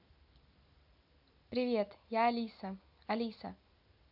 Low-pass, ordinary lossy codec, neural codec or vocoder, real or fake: 5.4 kHz; AAC, 48 kbps; none; real